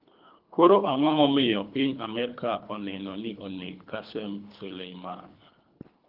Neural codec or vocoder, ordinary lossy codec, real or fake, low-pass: codec, 24 kHz, 3 kbps, HILCodec; Opus, 24 kbps; fake; 5.4 kHz